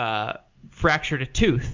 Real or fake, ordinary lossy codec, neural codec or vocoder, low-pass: real; MP3, 64 kbps; none; 7.2 kHz